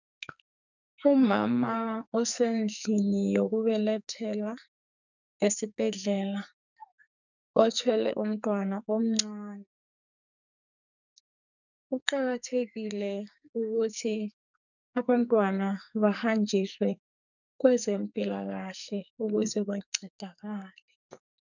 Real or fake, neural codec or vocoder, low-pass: fake; codec, 44.1 kHz, 2.6 kbps, SNAC; 7.2 kHz